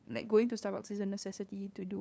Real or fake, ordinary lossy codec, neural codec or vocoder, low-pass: fake; none; codec, 16 kHz, 2 kbps, FunCodec, trained on LibriTTS, 25 frames a second; none